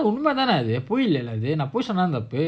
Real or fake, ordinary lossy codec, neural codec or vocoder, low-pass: real; none; none; none